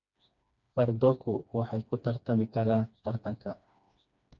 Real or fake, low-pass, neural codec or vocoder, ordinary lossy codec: fake; 7.2 kHz; codec, 16 kHz, 2 kbps, FreqCodec, smaller model; none